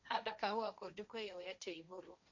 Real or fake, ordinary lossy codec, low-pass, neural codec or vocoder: fake; AAC, 48 kbps; 7.2 kHz; codec, 16 kHz, 1.1 kbps, Voila-Tokenizer